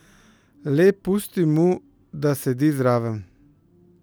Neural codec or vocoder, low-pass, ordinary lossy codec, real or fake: none; none; none; real